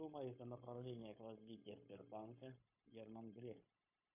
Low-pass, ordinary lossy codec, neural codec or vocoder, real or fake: 3.6 kHz; MP3, 24 kbps; codec, 16 kHz, 0.9 kbps, LongCat-Audio-Codec; fake